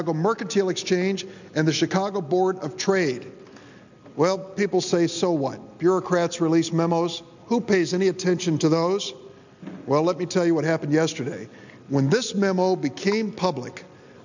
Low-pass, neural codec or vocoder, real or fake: 7.2 kHz; none; real